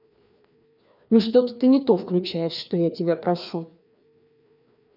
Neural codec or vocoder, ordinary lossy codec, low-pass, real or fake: codec, 16 kHz, 2 kbps, FreqCodec, larger model; none; 5.4 kHz; fake